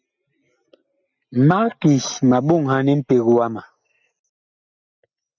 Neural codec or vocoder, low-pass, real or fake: none; 7.2 kHz; real